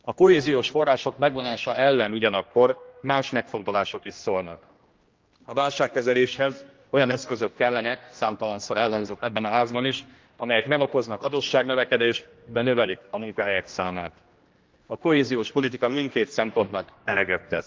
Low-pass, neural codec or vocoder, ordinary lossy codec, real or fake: 7.2 kHz; codec, 16 kHz, 1 kbps, X-Codec, HuBERT features, trained on general audio; Opus, 24 kbps; fake